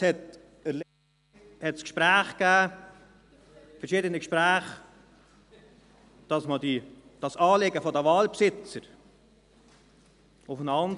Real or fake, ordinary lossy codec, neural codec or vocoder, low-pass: real; none; none; 10.8 kHz